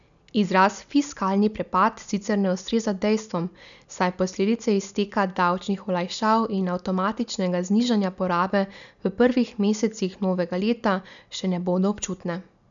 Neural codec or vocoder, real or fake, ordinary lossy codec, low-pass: none; real; none; 7.2 kHz